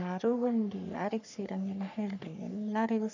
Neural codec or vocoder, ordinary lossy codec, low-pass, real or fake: codec, 44.1 kHz, 3.4 kbps, Pupu-Codec; none; 7.2 kHz; fake